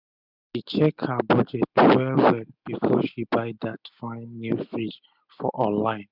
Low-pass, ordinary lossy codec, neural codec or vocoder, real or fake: 5.4 kHz; none; none; real